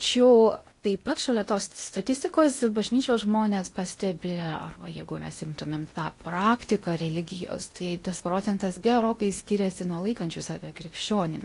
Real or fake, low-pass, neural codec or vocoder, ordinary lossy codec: fake; 10.8 kHz; codec, 16 kHz in and 24 kHz out, 0.8 kbps, FocalCodec, streaming, 65536 codes; AAC, 48 kbps